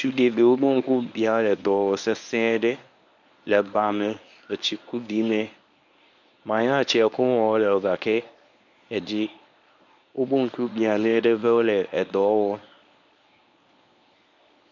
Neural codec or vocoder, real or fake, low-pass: codec, 24 kHz, 0.9 kbps, WavTokenizer, medium speech release version 1; fake; 7.2 kHz